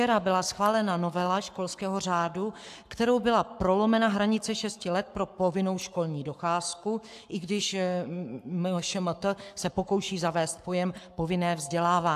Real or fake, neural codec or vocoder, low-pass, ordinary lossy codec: fake; codec, 44.1 kHz, 7.8 kbps, Pupu-Codec; 14.4 kHz; AAC, 96 kbps